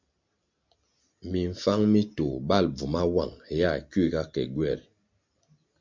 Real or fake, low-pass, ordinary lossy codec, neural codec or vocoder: real; 7.2 kHz; MP3, 64 kbps; none